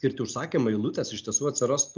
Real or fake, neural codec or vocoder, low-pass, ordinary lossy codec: real; none; 7.2 kHz; Opus, 24 kbps